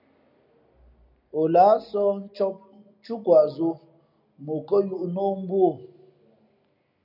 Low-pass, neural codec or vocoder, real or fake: 5.4 kHz; none; real